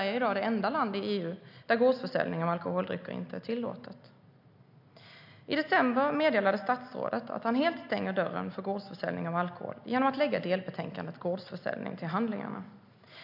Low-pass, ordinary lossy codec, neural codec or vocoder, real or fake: 5.4 kHz; MP3, 48 kbps; none; real